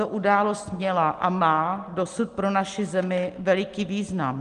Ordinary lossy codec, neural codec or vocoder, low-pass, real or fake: Opus, 24 kbps; none; 10.8 kHz; real